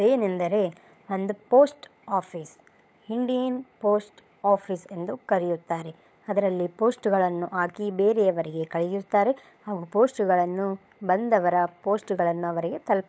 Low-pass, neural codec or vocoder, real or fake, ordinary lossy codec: none; codec, 16 kHz, 16 kbps, FunCodec, trained on LibriTTS, 50 frames a second; fake; none